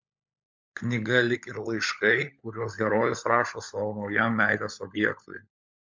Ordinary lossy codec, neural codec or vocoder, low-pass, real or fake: MP3, 64 kbps; codec, 16 kHz, 16 kbps, FunCodec, trained on LibriTTS, 50 frames a second; 7.2 kHz; fake